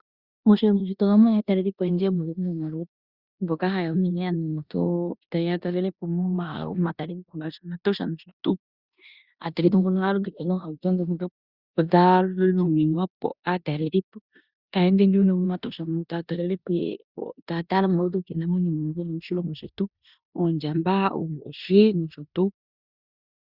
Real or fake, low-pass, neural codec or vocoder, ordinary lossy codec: fake; 5.4 kHz; codec, 16 kHz in and 24 kHz out, 0.9 kbps, LongCat-Audio-Codec, four codebook decoder; Opus, 64 kbps